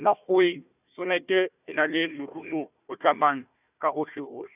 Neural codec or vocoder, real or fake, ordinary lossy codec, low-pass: codec, 16 kHz, 1 kbps, FunCodec, trained on Chinese and English, 50 frames a second; fake; none; 3.6 kHz